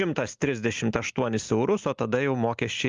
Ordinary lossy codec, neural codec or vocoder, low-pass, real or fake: Opus, 32 kbps; none; 7.2 kHz; real